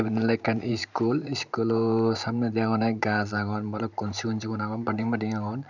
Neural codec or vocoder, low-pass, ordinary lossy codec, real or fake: vocoder, 44.1 kHz, 128 mel bands every 512 samples, BigVGAN v2; 7.2 kHz; none; fake